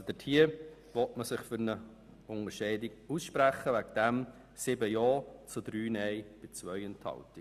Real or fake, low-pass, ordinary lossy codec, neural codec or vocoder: real; 14.4 kHz; Opus, 64 kbps; none